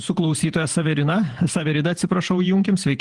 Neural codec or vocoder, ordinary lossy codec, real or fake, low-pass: vocoder, 48 kHz, 128 mel bands, Vocos; Opus, 24 kbps; fake; 10.8 kHz